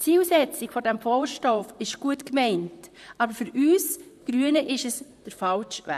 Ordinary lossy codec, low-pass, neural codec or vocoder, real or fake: none; 14.4 kHz; vocoder, 44.1 kHz, 128 mel bands, Pupu-Vocoder; fake